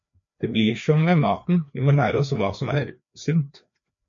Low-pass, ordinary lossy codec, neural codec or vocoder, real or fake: 7.2 kHz; MP3, 48 kbps; codec, 16 kHz, 2 kbps, FreqCodec, larger model; fake